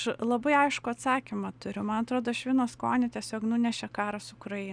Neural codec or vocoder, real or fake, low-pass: none; real; 9.9 kHz